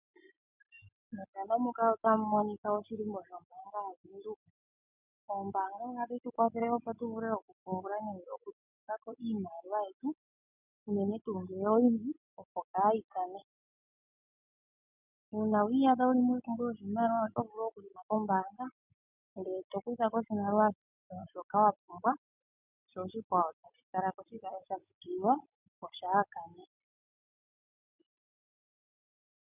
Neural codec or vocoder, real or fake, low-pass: none; real; 3.6 kHz